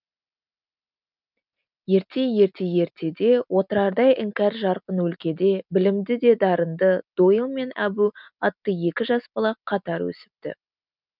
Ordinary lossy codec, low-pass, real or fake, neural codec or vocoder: none; 5.4 kHz; real; none